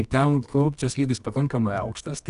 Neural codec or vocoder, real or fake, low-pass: codec, 24 kHz, 0.9 kbps, WavTokenizer, medium music audio release; fake; 10.8 kHz